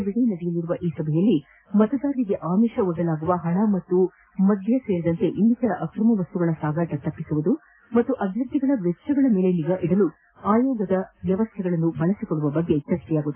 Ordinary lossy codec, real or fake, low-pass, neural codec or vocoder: AAC, 24 kbps; real; 3.6 kHz; none